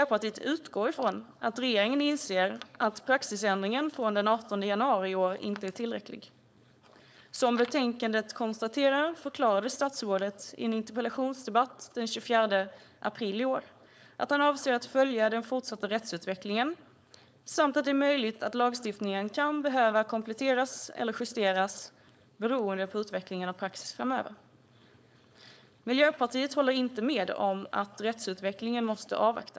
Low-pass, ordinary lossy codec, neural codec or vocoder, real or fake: none; none; codec, 16 kHz, 4.8 kbps, FACodec; fake